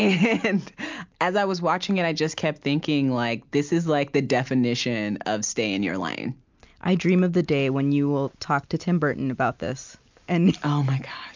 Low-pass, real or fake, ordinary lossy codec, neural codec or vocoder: 7.2 kHz; real; MP3, 64 kbps; none